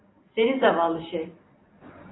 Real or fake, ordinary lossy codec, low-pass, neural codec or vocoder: real; AAC, 16 kbps; 7.2 kHz; none